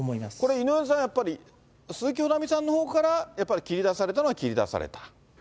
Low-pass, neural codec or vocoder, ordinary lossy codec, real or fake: none; none; none; real